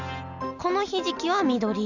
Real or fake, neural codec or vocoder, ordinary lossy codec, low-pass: real; none; none; 7.2 kHz